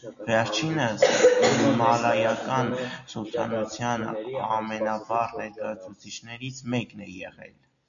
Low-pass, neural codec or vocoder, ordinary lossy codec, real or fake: 7.2 kHz; none; MP3, 96 kbps; real